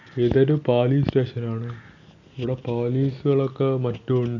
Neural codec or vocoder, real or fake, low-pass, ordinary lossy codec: none; real; 7.2 kHz; none